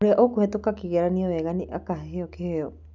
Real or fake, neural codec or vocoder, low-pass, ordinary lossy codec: real; none; 7.2 kHz; none